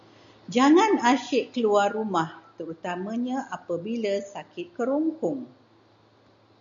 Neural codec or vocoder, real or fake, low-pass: none; real; 7.2 kHz